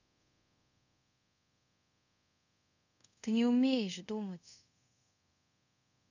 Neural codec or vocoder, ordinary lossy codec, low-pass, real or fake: codec, 24 kHz, 0.5 kbps, DualCodec; none; 7.2 kHz; fake